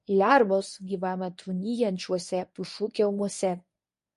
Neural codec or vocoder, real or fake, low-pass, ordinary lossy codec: codec, 24 kHz, 0.9 kbps, WavTokenizer, medium speech release version 1; fake; 10.8 kHz; MP3, 48 kbps